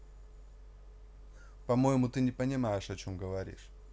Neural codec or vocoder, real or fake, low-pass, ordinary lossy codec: none; real; none; none